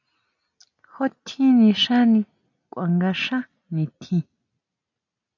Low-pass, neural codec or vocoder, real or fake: 7.2 kHz; none; real